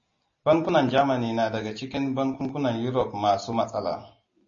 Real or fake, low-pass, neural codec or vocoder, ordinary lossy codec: real; 7.2 kHz; none; MP3, 32 kbps